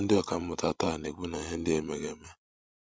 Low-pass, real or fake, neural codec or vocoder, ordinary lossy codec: none; fake; codec, 16 kHz, 16 kbps, FreqCodec, larger model; none